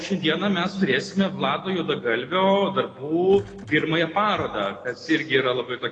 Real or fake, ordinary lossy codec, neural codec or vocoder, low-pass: real; AAC, 32 kbps; none; 9.9 kHz